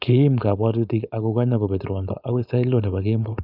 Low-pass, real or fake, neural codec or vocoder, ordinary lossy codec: 5.4 kHz; fake; codec, 16 kHz, 4.8 kbps, FACodec; none